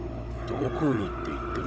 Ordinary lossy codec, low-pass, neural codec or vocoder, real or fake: none; none; codec, 16 kHz, 16 kbps, FunCodec, trained on Chinese and English, 50 frames a second; fake